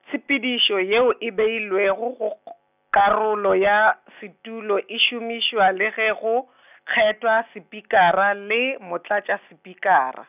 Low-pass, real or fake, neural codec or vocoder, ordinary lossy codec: 3.6 kHz; real; none; none